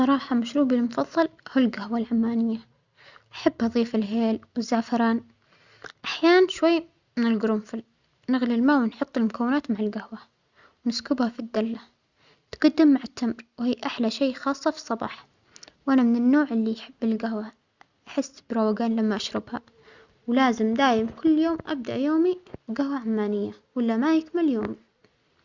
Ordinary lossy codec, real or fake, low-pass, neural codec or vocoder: Opus, 64 kbps; real; 7.2 kHz; none